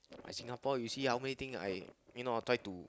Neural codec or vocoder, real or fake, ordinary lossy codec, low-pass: none; real; none; none